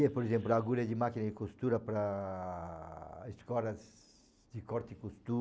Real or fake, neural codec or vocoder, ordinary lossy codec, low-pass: real; none; none; none